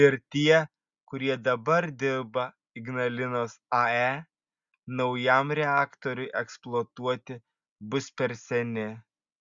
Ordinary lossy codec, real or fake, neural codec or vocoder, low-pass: Opus, 64 kbps; real; none; 7.2 kHz